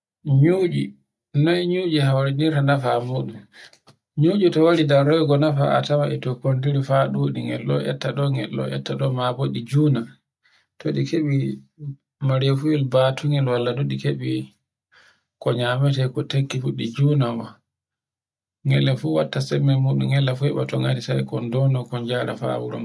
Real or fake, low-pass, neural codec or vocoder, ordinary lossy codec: real; none; none; none